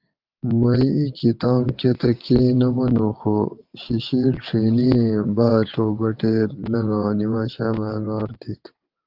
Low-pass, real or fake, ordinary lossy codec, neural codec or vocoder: 5.4 kHz; fake; Opus, 24 kbps; vocoder, 22.05 kHz, 80 mel bands, WaveNeXt